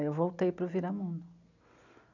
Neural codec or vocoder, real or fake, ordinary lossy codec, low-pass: none; real; none; 7.2 kHz